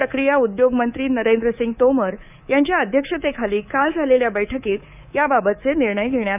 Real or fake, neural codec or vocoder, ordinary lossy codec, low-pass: fake; codec, 24 kHz, 3.1 kbps, DualCodec; none; 3.6 kHz